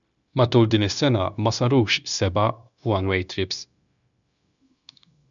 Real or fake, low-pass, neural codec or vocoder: fake; 7.2 kHz; codec, 16 kHz, 0.9 kbps, LongCat-Audio-Codec